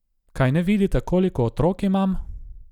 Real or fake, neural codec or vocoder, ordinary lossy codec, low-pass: real; none; none; 19.8 kHz